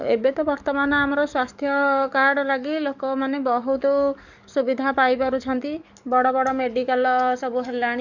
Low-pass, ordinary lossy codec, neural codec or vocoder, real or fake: 7.2 kHz; none; codec, 44.1 kHz, 7.8 kbps, DAC; fake